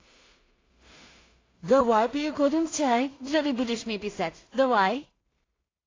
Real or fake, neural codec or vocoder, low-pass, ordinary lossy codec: fake; codec, 16 kHz in and 24 kHz out, 0.4 kbps, LongCat-Audio-Codec, two codebook decoder; 7.2 kHz; AAC, 32 kbps